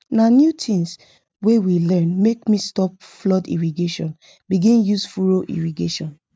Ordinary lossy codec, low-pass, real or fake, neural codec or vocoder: none; none; real; none